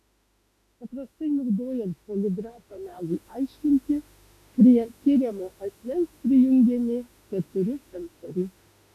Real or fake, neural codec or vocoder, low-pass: fake; autoencoder, 48 kHz, 32 numbers a frame, DAC-VAE, trained on Japanese speech; 14.4 kHz